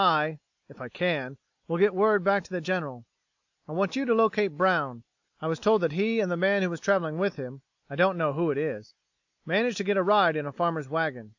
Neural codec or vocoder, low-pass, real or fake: none; 7.2 kHz; real